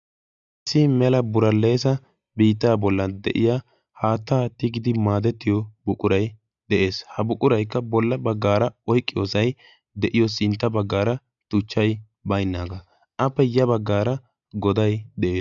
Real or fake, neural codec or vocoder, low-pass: real; none; 7.2 kHz